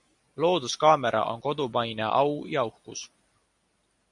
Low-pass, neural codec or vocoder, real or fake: 10.8 kHz; none; real